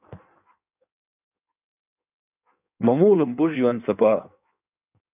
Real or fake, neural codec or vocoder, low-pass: fake; codec, 16 kHz in and 24 kHz out, 1.1 kbps, FireRedTTS-2 codec; 3.6 kHz